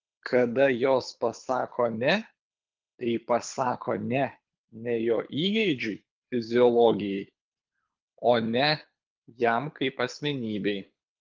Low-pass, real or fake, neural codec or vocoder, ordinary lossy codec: 7.2 kHz; fake; codec, 24 kHz, 6 kbps, HILCodec; Opus, 24 kbps